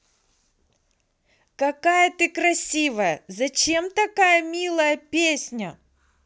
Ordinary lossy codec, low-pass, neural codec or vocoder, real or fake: none; none; none; real